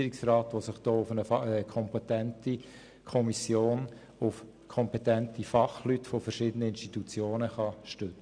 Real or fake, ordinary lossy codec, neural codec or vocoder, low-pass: real; none; none; 9.9 kHz